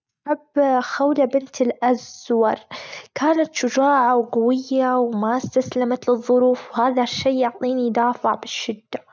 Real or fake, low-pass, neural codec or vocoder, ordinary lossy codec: real; none; none; none